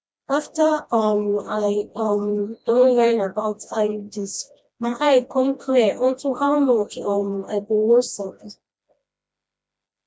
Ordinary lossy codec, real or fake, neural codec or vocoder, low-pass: none; fake; codec, 16 kHz, 1 kbps, FreqCodec, smaller model; none